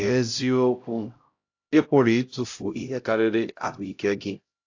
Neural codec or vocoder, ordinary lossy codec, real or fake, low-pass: codec, 16 kHz, 0.5 kbps, X-Codec, HuBERT features, trained on LibriSpeech; AAC, 48 kbps; fake; 7.2 kHz